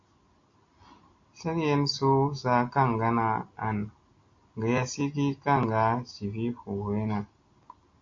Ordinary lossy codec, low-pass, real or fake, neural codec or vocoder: AAC, 48 kbps; 7.2 kHz; real; none